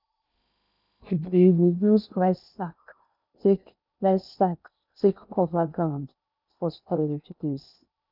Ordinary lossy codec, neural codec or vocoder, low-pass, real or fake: none; codec, 16 kHz in and 24 kHz out, 0.8 kbps, FocalCodec, streaming, 65536 codes; 5.4 kHz; fake